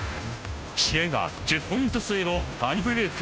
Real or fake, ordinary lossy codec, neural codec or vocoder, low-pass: fake; none; codec, 16 kHz, 0.5 kbps, FunCodec, trained on Chinese and English, 25 frames a second; none